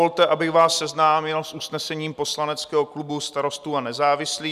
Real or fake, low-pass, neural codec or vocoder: real; 14.4 kHz; none